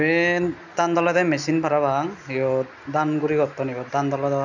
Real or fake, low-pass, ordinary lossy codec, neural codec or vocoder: real; 7.2 kHz; none; none